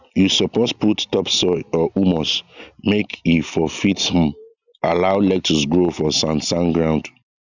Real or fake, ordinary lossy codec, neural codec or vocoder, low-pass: real; none; none; 7.2 kHz